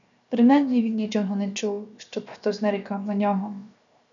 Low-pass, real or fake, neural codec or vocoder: 7.2 kHz; fake; codec, 16 kHz, 0.7 kbps, FocalCodec